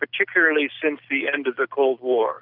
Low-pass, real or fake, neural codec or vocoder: 5.4 kHz; real; none